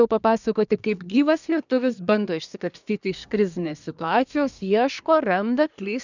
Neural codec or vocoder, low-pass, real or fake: codec, 24 kHz, 1 kbps, SNAC; 7.2 kHz; fake